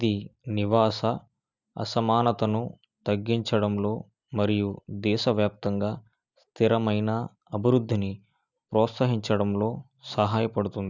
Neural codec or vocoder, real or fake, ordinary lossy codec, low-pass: none; real; none; 7.2 kHz